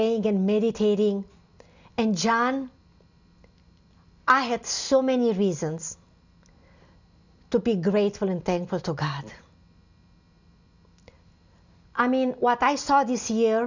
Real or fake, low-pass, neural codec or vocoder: real; 7.2 kHz; none